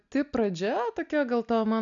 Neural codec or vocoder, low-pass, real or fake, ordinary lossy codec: none; 7.2 kHz; real; MP3, 96 kbps